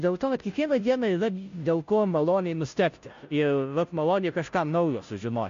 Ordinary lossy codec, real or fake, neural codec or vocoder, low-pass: MP3, 48 kbps; fake; codec, 16 kHz, 0.5 kbps, FunCodec, trained on Chinese and English, 25 frames a second; 7.2 kHz